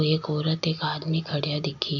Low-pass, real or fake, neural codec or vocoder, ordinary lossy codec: 7.2 kHz; real; none; none